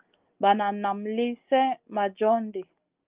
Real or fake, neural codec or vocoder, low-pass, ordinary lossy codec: real; none; 3.6 kHz; Opus, 24 kbps